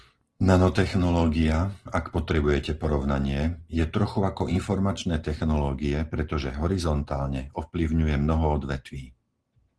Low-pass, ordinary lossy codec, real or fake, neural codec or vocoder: 10.8 kHz; Opus, 16 kbps; real; none